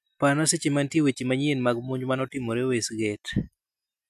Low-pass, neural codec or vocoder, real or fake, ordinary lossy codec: 14.4 kHz; none; real; none